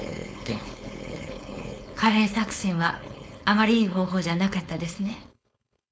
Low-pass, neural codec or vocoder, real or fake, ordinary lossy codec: none; codec, 16 kHz, 4.8 kbps, FACodec; fake; none